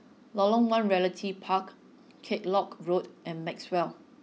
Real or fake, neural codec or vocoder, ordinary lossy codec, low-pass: real; none; none; none